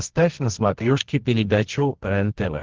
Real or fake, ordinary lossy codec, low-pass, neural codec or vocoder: fake; Opus, 16 kbps; 7.2 kHz; codec, 24 kHz, 0.9 kbps, WavTokenizer, medium music audio release